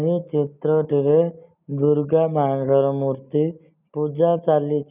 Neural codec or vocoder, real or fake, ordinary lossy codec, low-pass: none; real; none; 3.6 kHz